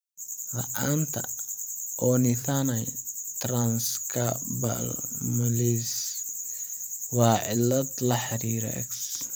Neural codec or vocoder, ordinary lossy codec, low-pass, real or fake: vocoder, 44.1 kHz, 128 mel bands every 512 samples, BigVGAN v2; none; none; fake